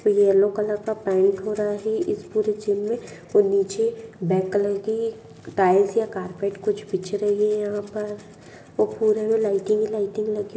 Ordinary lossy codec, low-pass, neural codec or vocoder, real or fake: none; none; none; real